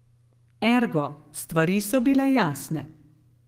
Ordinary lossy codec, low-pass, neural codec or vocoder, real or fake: Opus, 24 kbps; 14.4 kHz; codec, 32 kHz, 1.9 kbps, SNAC; fake